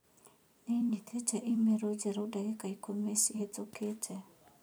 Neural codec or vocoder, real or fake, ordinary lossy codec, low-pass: vocoder, 44.1 kHz, 128 mel bands every 256 samples, BigVGAN v2; fake; none; none